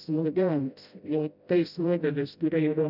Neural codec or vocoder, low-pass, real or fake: codec, 16 kHz, 0.5 kbps, FreqCodec, smaller model; 5.4 kHz; fake